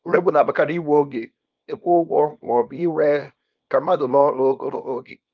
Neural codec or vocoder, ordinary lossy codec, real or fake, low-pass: codec, 24 kHz, 0.9 kbps, WavTokenizer, small release; Opus, 24 kbps; fake; 7.2 kHz